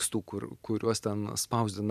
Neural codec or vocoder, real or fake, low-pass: vocoder, 44.1 kHz, 128 mel bands every 512 samples, BigVGAN v2; fake; 14.4 kHz